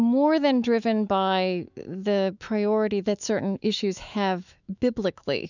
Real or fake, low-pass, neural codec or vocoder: fake; 7.2 kHz; autoencoder, 48 kHz, 128 numbers a frame, DAC-VAE, trained on Japanese speech